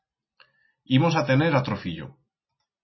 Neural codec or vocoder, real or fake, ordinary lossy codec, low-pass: none; real; MP3, 24 kbps; 7.2 kHz